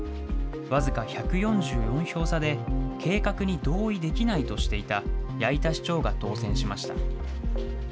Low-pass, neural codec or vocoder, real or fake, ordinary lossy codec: none; none; real; none